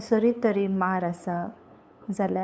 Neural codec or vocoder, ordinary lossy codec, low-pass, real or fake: codec, 16 kHz, 8 kbps, FunCodec, trained on LibriTTS, 25 frames a second; none; none; fake